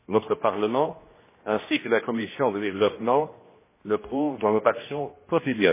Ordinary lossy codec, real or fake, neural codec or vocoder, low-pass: MP3, 16 kbps; fake; codec, 16 kHz, 1 kbps, X-Codec, HuBERT features, trained on general audio; 3.6 kHz